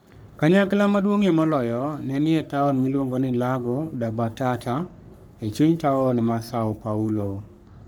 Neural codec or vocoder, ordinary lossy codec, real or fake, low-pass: codec, 44.1 kHz, 3.4 kbps, Pupu-Codec; none; fake; none